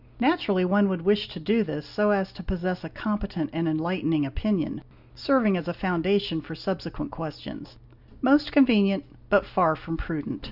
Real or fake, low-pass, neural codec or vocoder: real; 5.4 kHz; none